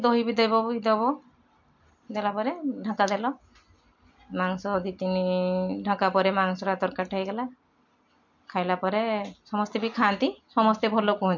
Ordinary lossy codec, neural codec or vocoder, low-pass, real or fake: MP3, 48 kbps; none; 7.2 kHz; real